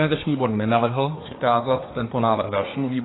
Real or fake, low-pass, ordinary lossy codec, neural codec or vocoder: fake; 7.2 kHz; AAC, 16 kbps; codec, 24 kHz, 1 kbps, SNAC